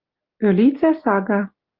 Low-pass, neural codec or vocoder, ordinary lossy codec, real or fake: 5.4 kHz; none; Opus, 24 kbps; real